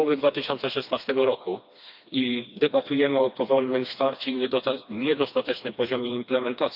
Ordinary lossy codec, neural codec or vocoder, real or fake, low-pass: none; codec, 16 kHz, 2 kbps, FreqCodec, smaller model; fake; 5.4 kHz